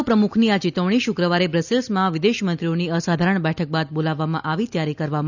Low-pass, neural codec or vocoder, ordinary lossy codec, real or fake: 7.2 kHz; none; none; real